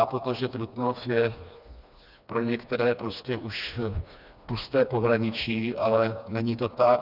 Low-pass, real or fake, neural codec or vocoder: 5.4 kHz; fake; codec, 16 kHz, 2 kbps, FreqCodec, smaller model